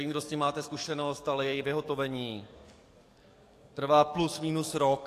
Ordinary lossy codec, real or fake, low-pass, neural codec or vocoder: AAC, 64 kbps; fake; 14.4 kHz; codec, 44.1 kHz, 7.8 kbps, DAC